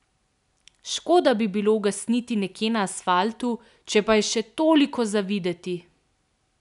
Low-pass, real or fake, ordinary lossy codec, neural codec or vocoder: 10.8 kHz; real; none; none